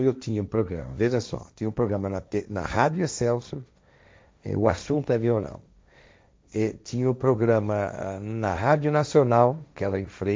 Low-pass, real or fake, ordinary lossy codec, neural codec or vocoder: none; fake; none; codec, 16 kHz, 1.1 kbps, Voila-Tokenizer